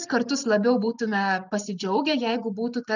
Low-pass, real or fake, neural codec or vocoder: 7.2 kHz; real; none